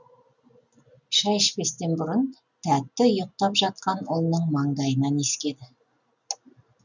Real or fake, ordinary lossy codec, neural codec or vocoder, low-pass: real; none; none; 7.2 kHz